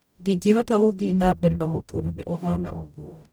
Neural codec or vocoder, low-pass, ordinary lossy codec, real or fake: codec, 44.1 kHz, 0.9 kbps, DAC; none; none; fake